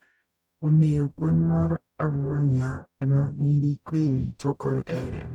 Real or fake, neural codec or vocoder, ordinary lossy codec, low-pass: fake; codec, 44.1 kHz, 0.9 kbps, DAC; none; 19.8 kHz